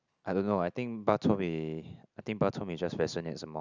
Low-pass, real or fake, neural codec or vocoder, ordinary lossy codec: 7.2 kHz; real; none; none